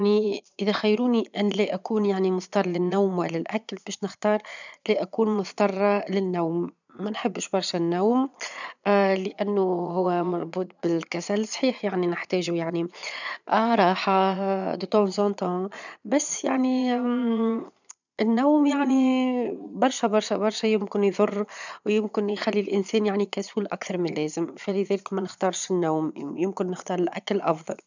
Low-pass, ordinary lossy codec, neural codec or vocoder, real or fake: 7.2 kHz; none; vocoder, 22.05 kHz, 80 mel bands, Vocos; fake